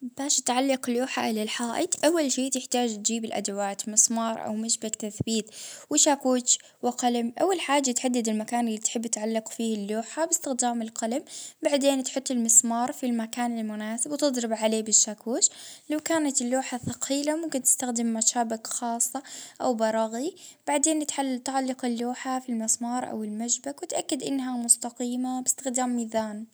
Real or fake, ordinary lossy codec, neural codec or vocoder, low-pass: real; none; none; none